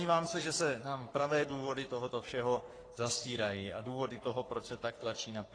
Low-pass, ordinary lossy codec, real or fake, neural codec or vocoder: 9.9 kHz; AAC, 32 kbps; fake; codec, 44.1 kHz, 3.4 kbps, Pupu-Codec